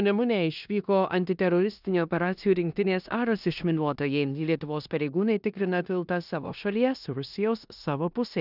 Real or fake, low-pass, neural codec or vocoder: fake; 5.4 kHz; codec, 16 kHz in and 24 kHz out, 0.9 kbps, LongCat-Audio-Codec, four codebook decoder